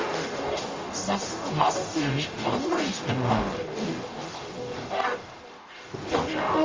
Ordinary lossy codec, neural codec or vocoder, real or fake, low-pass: Opus, 32 kbps; codec, 44.1 kHz, 0.9 kbps, DAC; fake; 7.2 kHz